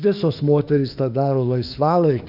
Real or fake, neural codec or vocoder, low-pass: fake; autoencoder, 48 kHz, 32 numbers a frame, DAC-VAE, trained on Japanese speech; 5.4 kHz